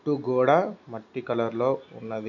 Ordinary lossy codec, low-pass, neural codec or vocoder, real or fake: none; 7.2 kHz; none; real